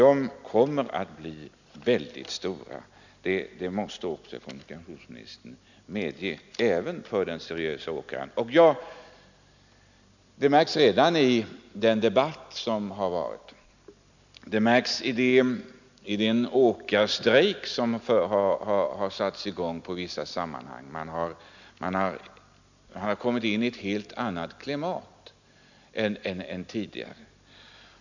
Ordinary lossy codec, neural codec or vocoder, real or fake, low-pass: none; none; real; 7.2 kHz